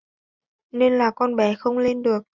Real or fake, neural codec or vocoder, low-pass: real; none; 7.2 kHz